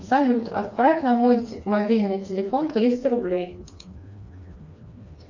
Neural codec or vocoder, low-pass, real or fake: codec, 16 kHz, 2 kbps, FreqCodec, smaller model; 7.2 kHz; fake